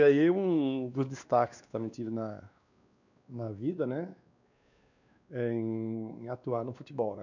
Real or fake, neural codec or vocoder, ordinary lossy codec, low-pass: fake; codec, 16 kHz, 2 kbps, X-Codec, WavLM features, trained on Multilingual LibriSpeech; none; 7.2 kHz